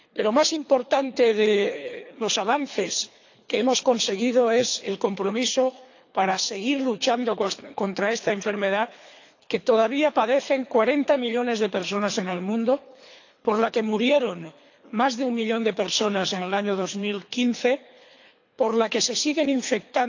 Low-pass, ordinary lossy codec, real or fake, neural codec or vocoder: 7.2 kHz; AAC, 48 kbps; fake; codec, 24 kHz, 3 kbps, HILCodec